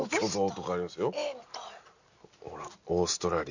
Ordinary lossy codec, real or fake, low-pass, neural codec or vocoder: none; real; 7.2 kHz; none